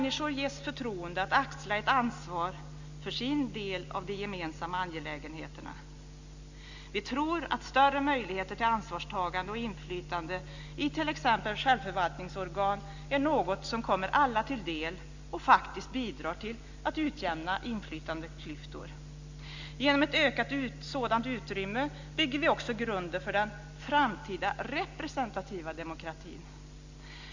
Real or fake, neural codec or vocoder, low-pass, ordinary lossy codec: real; none; 7.2 kHz; Opus, 64 kbps